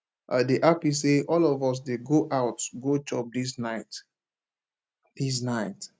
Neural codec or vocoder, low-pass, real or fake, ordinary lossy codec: none; none; real; none